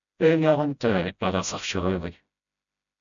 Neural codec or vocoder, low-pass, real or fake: codec, 16 kHz, 0.5 kbps, FreqCodec, smaller model; 7.2 kHz; fake